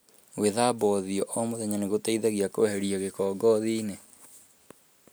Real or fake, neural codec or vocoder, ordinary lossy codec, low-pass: real; none; none; none